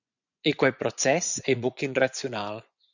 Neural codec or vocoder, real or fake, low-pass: vocoder, 24 kHz, 100 mel bands, Vocos; fake; 7.2 kHz